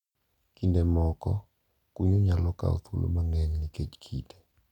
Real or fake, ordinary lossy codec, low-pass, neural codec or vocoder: real; none; 19.8 kHz; none